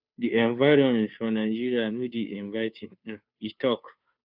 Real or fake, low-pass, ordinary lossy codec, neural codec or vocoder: fake; 5.4 kHz; none; codec, 16 kHz, 2 kbps, FunCodec, trained on Chinese and English, 25 frames a second